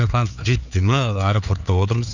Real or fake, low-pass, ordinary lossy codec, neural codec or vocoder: fake; 7.2 kHz; none; codec, 16 kHz, 4 kbps, X-Codec, HuBERT features, trained on LibriSpeech